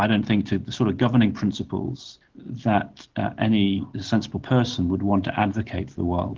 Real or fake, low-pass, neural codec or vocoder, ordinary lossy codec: real; 7.2 kHz; none; Opus, 16 kbps